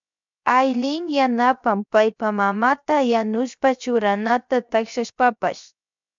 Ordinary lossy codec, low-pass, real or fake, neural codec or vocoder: MP3, 64 kbps; 7.2 kHz; fake; codec, 16 kHz, 0.7 kbps, FocalCodec